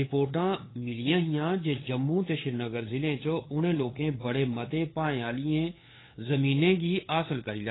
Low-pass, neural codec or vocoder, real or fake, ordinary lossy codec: 7.2 kHz; codec, 16 kHz, 8 kbps, FunCodec, trained on LibriTTS, 25 frames a second; fake; AAC, 16 kbps